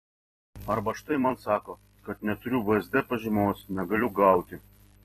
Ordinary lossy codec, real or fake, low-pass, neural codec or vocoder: AAC, 32 kbps; fake; 19.8 kHz; vocoder, 44.1 kHz, 128 mel bands every 256 samples, BigVGAN v2